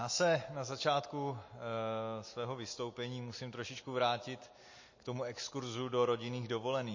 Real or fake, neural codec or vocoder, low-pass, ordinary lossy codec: real; none; 7.2 kHz; MP3, 32 kbps